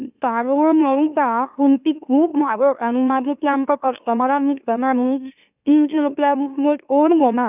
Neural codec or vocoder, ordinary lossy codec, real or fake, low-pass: autoencoder, 44.1 kHz, a latent of 192 numbers a frame, MeloTTS; none; fake; 3.6 kHz